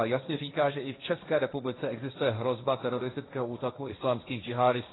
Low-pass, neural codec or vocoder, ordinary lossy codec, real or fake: 7.2 kHz; codec, 16 kHz, 1.1 kbps, Voila-Tokenizer; AAC, 16 kbps; fake